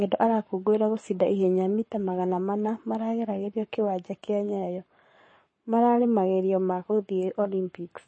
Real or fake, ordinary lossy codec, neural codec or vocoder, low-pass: fake; MP3, 32 kbps; codec, 44.1 kHz, 7.8 kbps, Pupu-Codec; 9.9 kHz